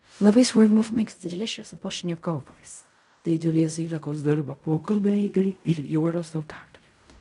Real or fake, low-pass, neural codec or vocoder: fake; 10.8 kHz; codec, 16 kHz in and 24 kHz out, 0.4 kbps, LongCat-Audio-Codec, fine tuned four codebook decoder